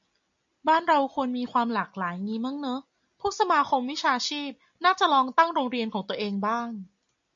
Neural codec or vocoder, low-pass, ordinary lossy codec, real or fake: none; 7.2 kHz; MP3, 64 kbps; real